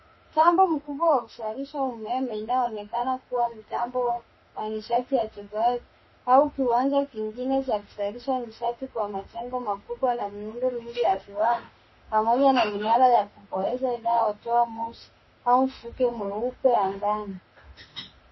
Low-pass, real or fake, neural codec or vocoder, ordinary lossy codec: 7.2 kHz; fake; autoencoder, 48 kHz, 32 numbers a frame, DAC-VAE, trained on Japanese speech; MP3, 24 kbps